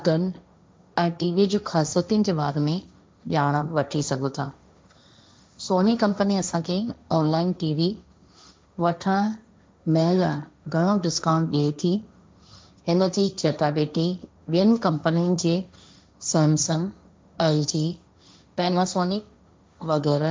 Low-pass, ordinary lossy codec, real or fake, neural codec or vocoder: none; none; fake; codec, 16 kHz, 1.1 kbps, Voila-Tokenizer